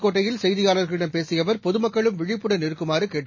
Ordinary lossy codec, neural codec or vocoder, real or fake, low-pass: MP3, 32 kbps; none; real; 7.2 kHz